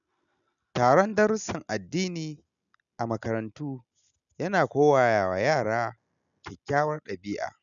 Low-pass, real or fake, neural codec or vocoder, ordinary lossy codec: 7.2 kHz; real; none; none